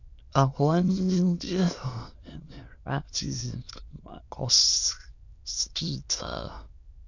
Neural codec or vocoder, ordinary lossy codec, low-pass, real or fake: autoencoder, 22.05 kHz, a latent of 192 numbers a frame, VITS, trained on many speakers; none; 7.2 kHz; fake